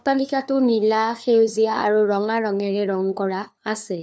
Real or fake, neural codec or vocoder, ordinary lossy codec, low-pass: fake; codec, 16 kHz, 2 kbps, FunCodec, trained on LibriTTS, 25 frames a second; none; none